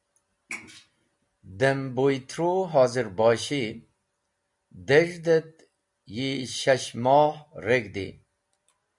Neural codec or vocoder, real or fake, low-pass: none; real; 10.8 kHz